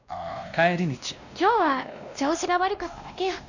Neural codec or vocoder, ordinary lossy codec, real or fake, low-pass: codec, 16 kHz, 1 kbps, X-Codec, WavLM features, trained on Multilingual LibriSpeech; none; fake; 7.2 kHz